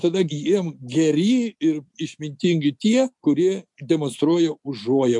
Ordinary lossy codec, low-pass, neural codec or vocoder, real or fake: MP3, 64 kbps; 10.8 kHz; none; real